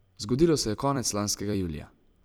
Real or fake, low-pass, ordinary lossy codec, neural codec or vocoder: fake; none; none; vocoder, 44.1 kHz, 128 mel bands every 256 samples, BigVGAN v2